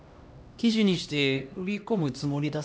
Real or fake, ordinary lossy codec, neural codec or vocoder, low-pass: fake; none; codec, 16 kHz, 1 kbps, X-Codec, HuBERT features, trained on LibriSpeech; none